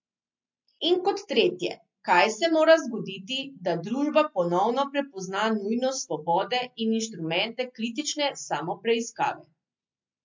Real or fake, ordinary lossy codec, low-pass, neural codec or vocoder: real; MP3, 48 kbps; 7.2 kHz; none